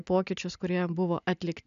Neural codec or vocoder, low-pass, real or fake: none; 7.2 kHz; real